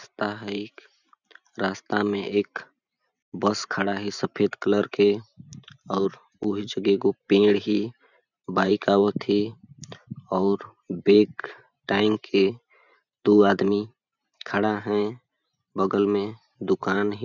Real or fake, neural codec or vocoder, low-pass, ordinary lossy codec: fake; vocoder, 44.1 kHz, 128 mel bands every 512 samples, BigVGAN v2; 7.2 kHz; none